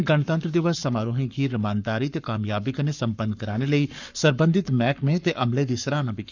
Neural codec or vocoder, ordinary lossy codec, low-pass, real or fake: codec, 44.1 kHz, 7.8 kbps, Pupu-Codec; none; 7.2 kHz; fake